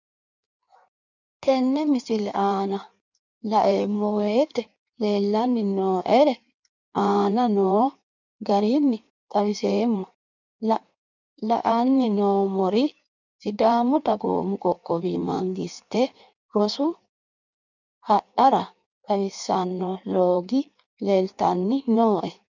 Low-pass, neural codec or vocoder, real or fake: 7.2 kHz; codec, 16 kHz in and 24 kHz out, 1.1 kbps, FireRedTTS-2 codec; fake